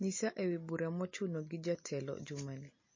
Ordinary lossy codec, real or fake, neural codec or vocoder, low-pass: MP3, 32 kbps; real; none; 7.2 kHz